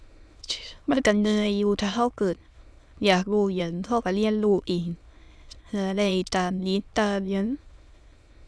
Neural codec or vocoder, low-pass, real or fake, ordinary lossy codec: autoencoder, 22.05 kHz, a latent of 192 numbers a frame, VITS, trained on many speakers; none; fake; none